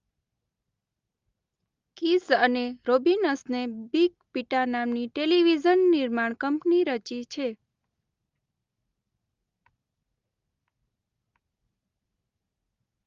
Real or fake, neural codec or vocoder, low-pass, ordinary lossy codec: real; none; 7.2 kHz; Opus, 32 kbps